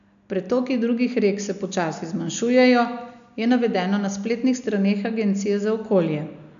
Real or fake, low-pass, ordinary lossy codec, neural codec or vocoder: real; 7.2 kHz; none; none